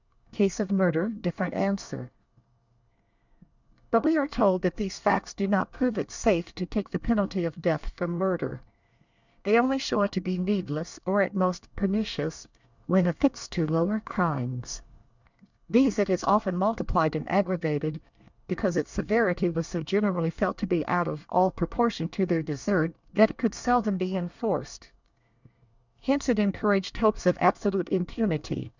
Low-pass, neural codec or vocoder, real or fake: 7.2 kHz; codec, 24 kHz, 1 kbps, SNAC; fake